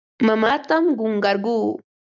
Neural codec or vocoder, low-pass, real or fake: none; 7.2 kHz; real